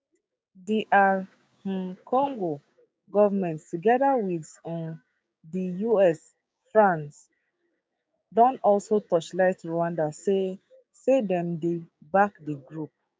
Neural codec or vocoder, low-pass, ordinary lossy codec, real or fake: codec, 16 kHz, 6 kbps, DAC; none; none; fake